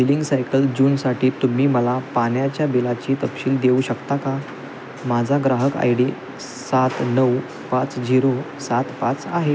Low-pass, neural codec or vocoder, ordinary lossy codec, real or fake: none; none; none; real